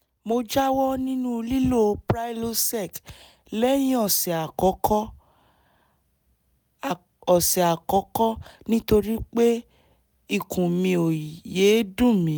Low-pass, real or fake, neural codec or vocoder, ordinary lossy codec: none; real; none; none